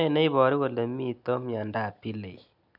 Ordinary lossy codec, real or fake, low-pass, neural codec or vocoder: none; real; 5.4 kHz; none